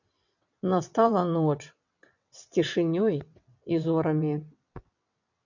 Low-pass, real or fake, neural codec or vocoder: 7.2 kHz; fake; vocoder, 22.05 kHz, 80 mel bands, WaveNeXt